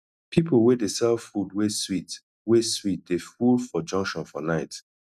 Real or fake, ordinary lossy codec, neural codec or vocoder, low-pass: real; none; none; 14.4 kHz